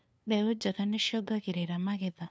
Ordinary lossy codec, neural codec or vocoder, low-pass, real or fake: none; codec, 16 kHz, 2 kbps, FunCodec, trained on LibriTTS, 25 frames a second; none; fake